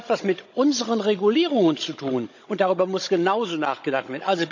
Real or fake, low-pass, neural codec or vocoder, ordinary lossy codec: fake; 7.2 kHz; codec, 16 kHz, 16 kbps, FunCodec, trained on Chinese and English, 50 frames a second; none